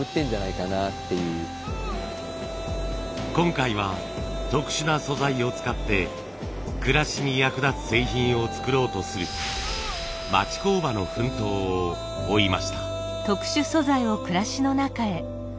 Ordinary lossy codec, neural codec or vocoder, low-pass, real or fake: none; none; none; real